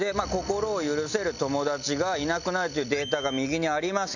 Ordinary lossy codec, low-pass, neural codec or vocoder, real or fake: none; 7.2 kHz; none; real